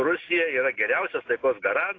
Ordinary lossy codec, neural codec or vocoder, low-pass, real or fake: AAC, 32 kbps; none; 7.2 kHz; real